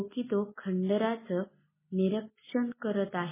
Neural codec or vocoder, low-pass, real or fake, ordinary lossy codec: none; 3.6 kHz; real; MP3, 16 kbps